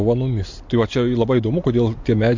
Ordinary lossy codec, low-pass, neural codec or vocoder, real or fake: MP3, 48 kbps; 7.2 kHz; none; real